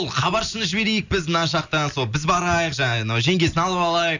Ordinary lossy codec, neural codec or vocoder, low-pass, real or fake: none; none; 7.2 kHz; real